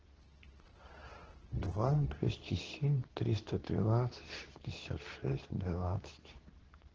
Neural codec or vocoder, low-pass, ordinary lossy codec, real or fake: codec, 24 kHz, 0.9 kbps, WavTokenizer, medium speech release version 2; 7.2 kHz; Opus, 16 kbps; fake